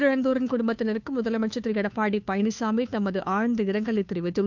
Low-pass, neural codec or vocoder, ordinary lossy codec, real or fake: 7.2 kHz; codec, 16 kHz, 2 kbps, FunCodec, trained on Chinese and English, 25 frames a second; none; fake